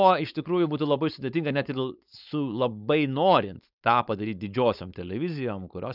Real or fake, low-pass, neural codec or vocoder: fake; 5.4 kHz; codec, 16 kHz, 4.8 kbps, FACodec